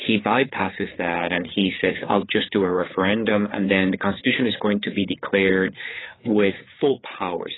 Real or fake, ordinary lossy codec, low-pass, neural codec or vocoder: fake; AAC, 16 kbps; 7.2 kHz; codec, 16 kHz, 4 kbps, FreqCodec, larger model